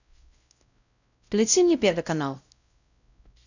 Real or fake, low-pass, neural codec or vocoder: fake; 7.2 kHz; codec, 16 kHz, 0.5 kbps, X-Codec, WavLM features, trained on Multilingual LibriSpeech